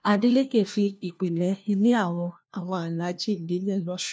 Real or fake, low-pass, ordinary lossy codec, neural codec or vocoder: fake; none; none; codec, 16 kHz, 1 kbps, FunCodec, trained on LibriTTS, 50 frames a second